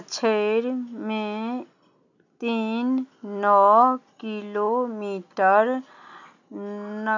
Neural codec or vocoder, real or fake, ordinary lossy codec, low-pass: none; real; none; 7.2 kHz